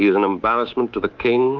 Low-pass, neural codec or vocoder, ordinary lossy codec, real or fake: 7.2 kHz; codec, 24 kHz, 3.1 kbps, DualCodec; Opus, 24 kbps; fake